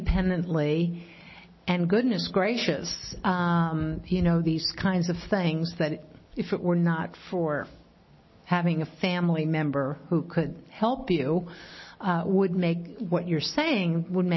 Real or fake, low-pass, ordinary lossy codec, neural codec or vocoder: real; 7.2 kHz; MP3, 24 kbps; none